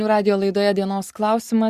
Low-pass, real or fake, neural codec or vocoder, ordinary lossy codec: 14.4 kHz; real; none; Opus, 64 kbps